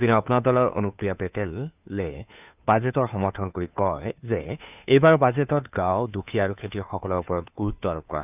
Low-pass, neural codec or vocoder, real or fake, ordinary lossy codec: 3.6 kHz; codec, 16 kHz, 2 kbps, FunCodec, trained on Chinese and English, 25 frames a second; fake; none